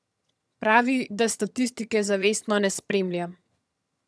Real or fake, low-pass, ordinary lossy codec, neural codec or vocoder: fake; none; none; vocoder, 22.05 kHz, 80 mel bands, HiFi-GAN